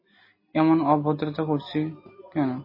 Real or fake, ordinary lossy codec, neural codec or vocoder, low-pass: real; MP3, 24 kbps; none; 5.4 kHz